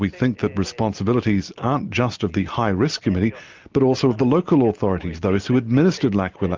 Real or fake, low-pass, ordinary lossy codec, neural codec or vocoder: real; 7.2 kHz; Opus, 24 kbps; none